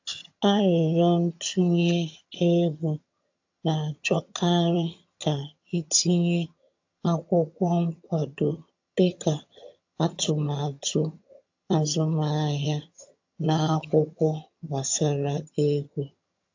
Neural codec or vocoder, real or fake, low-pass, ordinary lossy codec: vocoder, 22.05 kHz, 80 mel bands, HiFi-GAN; fake; 7.2 kHz; AAC, 48 kbps